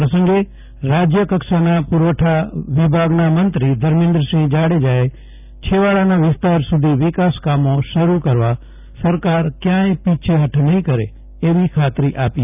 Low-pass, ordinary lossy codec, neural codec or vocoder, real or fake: 3.6 kHz; none; none; real